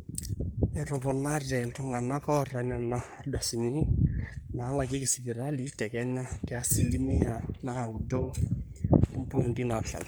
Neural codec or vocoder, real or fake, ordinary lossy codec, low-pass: codec, 44.1 kHz, 3.4 kbps, Pupu-Codec; fake; none; none